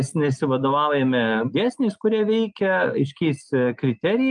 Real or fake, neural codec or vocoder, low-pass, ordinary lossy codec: real; none; 9.9 kHz; Opus, 32 kbps